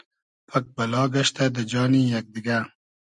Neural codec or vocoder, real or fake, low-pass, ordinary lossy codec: none; real; 10.8 kHz; MP3, 96 kbps